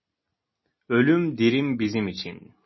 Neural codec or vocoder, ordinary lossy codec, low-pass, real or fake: none; MP3, 24 kbps; 7.2 kHz; real